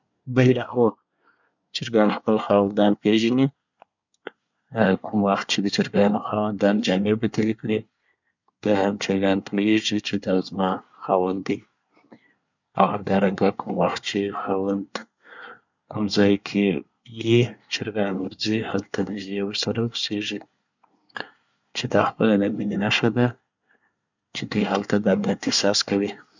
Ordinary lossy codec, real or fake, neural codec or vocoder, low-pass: none; fake; codec, 24 kHz, 1 kbps, SNAC; 7.2 kHz